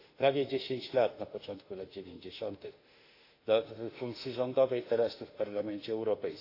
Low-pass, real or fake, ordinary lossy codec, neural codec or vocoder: 5.4 kHz; fake; AAC, 32 kbps; autoencoder, 48 kHz, 32 numbers a frame, DAC-VAE, trained on Japanese speech